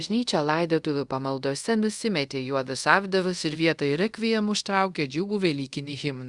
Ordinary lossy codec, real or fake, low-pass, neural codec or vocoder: Opus, 64 kbps; fake; 10.8 kHz; codec, 24 kHz, 0.5 kbps, DualCodec